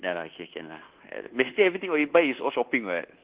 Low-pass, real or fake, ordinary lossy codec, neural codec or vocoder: 3.6 kHz; fake; Opus, 16 kbps; codec, 24 kHz, 1.2 kbps, DualCodec